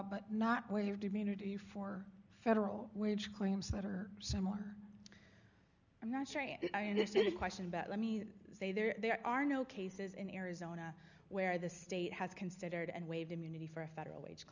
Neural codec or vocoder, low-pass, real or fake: none; 7.2 kHz; real